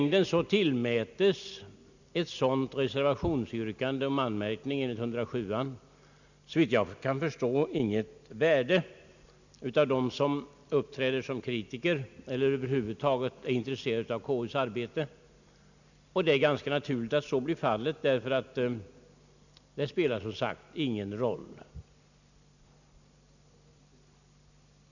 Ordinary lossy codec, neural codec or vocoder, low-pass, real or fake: none; none; 7.2 kHz; real